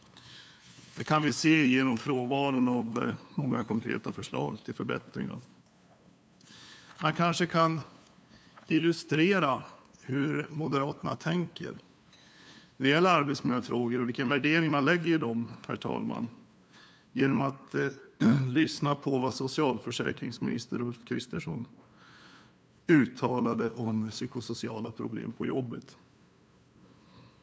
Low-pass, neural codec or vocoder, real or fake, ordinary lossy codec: none; codec, 16 kHz, 2 kbps, FunCodec, trained on LibriTTS, 25 frames a second; fake; none